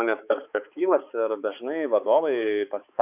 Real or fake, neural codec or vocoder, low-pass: fake; codec, 16 kHz, 4 kbps, X-Codec, HuBERT features, trained on balanced general audio; 3.6 kHz